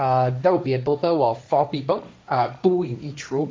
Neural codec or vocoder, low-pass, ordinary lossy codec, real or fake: codec, 16 kHz, 1.1 kbps, Voila-Tokenizer; none; none; fake